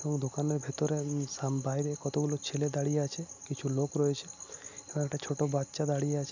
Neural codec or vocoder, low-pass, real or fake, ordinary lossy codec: none; 7.2 kHz; real; none